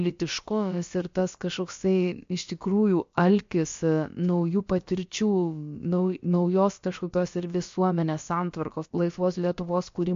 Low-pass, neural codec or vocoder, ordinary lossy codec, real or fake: 7.2 kHz; codec, 16 kHz, about 1 kbps, DyCAST, with the encoder's durations; MP3, 48 kbps; fake